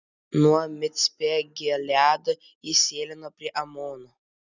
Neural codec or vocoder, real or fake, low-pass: none; real; 7.2 kHz